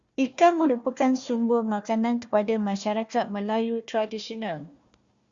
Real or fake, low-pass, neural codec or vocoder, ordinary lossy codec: fake; 7.2 kHz; codec, 16 kHz, 1 kbps, FunCodec, trained on Chinese and English, 50 frames a second; Opus, 64 kbps